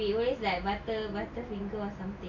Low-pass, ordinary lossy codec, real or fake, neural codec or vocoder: 7.2 kHz; none; real; none